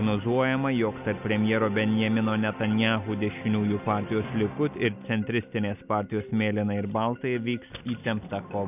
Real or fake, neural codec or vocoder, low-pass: real; none; 3.6 kHz